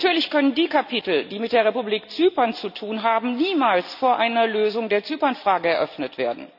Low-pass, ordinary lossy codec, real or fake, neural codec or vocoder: 5.4 kHz; none; real; none